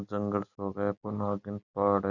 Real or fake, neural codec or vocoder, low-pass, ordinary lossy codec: real; none; 7.2 kHz; none